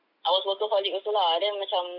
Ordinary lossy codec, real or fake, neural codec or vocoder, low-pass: none; real; none; 5.4 kHz